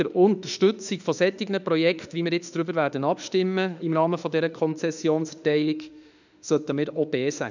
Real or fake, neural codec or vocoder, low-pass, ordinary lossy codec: fake; autoencoder, 48 kHz, 32 numbers a frame, DAC-VAE, trained on Japanese speech; 7.2 kHz; none